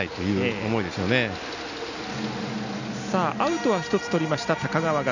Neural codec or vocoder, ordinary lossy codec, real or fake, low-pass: none; none; real; 7.2 kHz